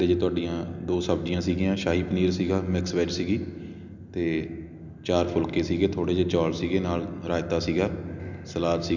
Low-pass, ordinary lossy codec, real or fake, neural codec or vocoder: 7.2 kHz; none; real; none